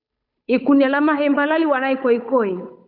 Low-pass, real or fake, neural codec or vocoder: 5.4 kHz; fake; codec, 16 kHz, 8 kbps, FunCodec, trained on Chinese and English, 25 frames a second